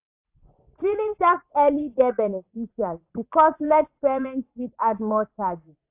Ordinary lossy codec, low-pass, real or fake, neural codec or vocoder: AAC, 32 kbps; 3.6 kHz; fake; vocoder, 44.1 kHz, 80 mel bands, Vocos